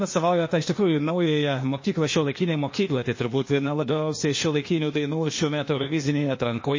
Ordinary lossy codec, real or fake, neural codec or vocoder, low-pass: MP3, 32 kbps; fake; codec, 16 kHz, 0.8 kbps, ZipCodec; 7.2 kHz